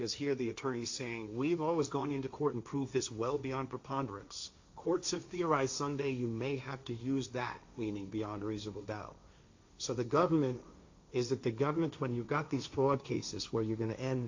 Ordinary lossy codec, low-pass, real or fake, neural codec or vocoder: AAC, 48 kbps; 7.2 kHz; fake; codec, 16 kHz, 1.1 kbps, Voila-Tokenizer